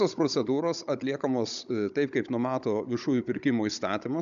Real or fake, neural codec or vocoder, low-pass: fake; codec, 16 kHz, 16 kbps, FunCodec, trained on Chinese and English, 50 frames a second; 7.2 kHz